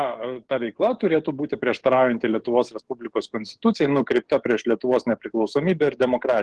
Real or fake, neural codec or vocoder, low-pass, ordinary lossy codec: fake; codec, 44.1 kHz, 7.8 kbps, DAC; 10.8 kHz; Opus, 16 kbps